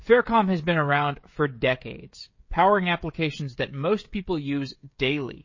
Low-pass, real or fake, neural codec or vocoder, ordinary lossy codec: 7.2 kHz; fake; codec, 16 kHz, 16 kbps, FreqCodec, smaller model; MP3, 32 kbps